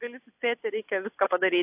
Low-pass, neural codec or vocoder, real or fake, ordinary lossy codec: 3.6 kHz; none; real; AAC, 24 kbps